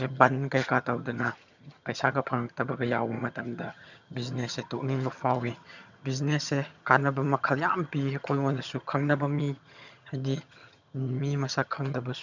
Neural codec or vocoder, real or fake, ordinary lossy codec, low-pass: vocoder, 22.05 kHz, 80 mel bands, HiFi-GAN; fake; none; 7.2 kHz